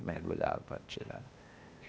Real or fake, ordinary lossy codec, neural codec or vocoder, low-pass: fake; none; codec, 16 kHz, 0.8 kbps, ZipCodec; none